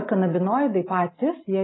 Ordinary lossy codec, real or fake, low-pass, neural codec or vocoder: AAC, 16 kbps; real; 7.2 kHz; none